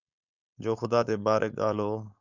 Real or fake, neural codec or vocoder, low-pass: fake; codec, 16 kHz, 4.8 kbps, FACodec; 7.2 kHz